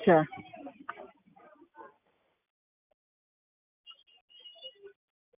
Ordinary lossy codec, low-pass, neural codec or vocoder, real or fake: none; 3.6 kHz; none; real